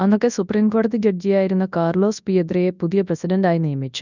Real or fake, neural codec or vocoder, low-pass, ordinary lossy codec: fake; codec, 24 kHz, 0.9 kbps, WavTokenizer, large speech release; 7.2 kHz; none